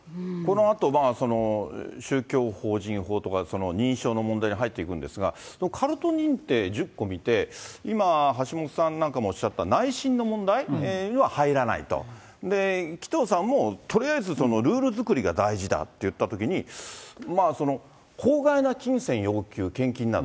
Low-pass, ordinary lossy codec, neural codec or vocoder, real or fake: none; none; none; real